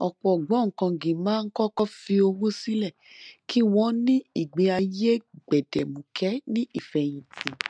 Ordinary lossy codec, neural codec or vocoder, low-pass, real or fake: none; none; 9.9 kHz; real